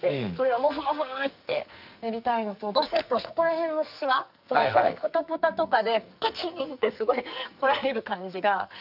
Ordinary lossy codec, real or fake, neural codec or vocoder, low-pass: none; fake; codec, 44.1 kHz, 2.6 kbps, SNAC; 5.4 kHz